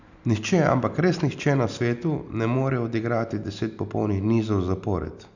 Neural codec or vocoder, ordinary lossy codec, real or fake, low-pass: none; none; real; 7.2 kHz